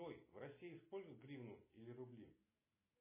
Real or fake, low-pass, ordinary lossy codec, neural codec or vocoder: real; 3.6 kHz; MP3, 16 kbps; none